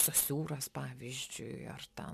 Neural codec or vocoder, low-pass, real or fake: none; 14.4 kHz; real